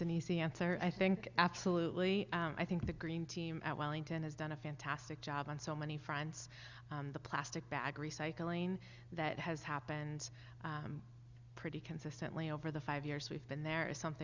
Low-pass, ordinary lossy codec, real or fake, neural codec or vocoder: 7.2 kHz; Opus, 64 kbps; real; none